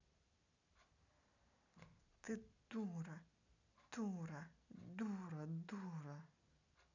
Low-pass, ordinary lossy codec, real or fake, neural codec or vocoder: 7.2 kHz; none; real; none